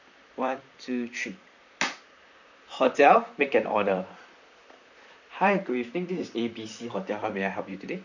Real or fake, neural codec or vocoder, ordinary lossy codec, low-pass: fake; vocoder, 44.1 kHz, 128 mel bands, Pupu-Vocoder; none; 7.2 kHz